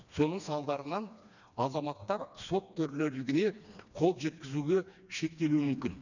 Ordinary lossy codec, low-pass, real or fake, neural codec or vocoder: none; 7.2 kHz; fake; codec, 16 kHz, 2 kbps, FreqCodec, smaller model